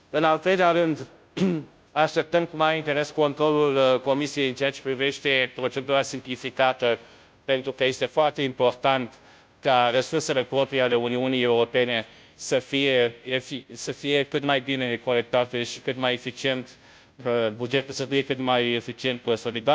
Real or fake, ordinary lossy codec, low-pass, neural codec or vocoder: fake; none; none; codec, 16 kHz, 0.5 kbps, FunCodec, trained on Chinese and English, 25 frames a second